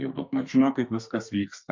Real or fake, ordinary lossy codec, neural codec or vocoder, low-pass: fake; MP3, 64 kbps; codec, 32 kHz, 1.9 kbps, SNAC; 7.2 kHz